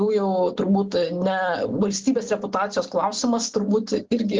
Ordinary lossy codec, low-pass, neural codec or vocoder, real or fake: Opus, 16 kbps; 7.2 kHz; none; real